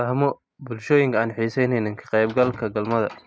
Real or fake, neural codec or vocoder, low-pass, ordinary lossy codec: real; none; none; none